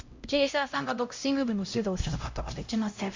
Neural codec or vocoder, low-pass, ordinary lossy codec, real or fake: codec, 16 kHz, 0.5 kbps, X-Codec, HuBERT features, trained on LibriSpeech; 7.2 kHz; MP3, 48 kbps; fake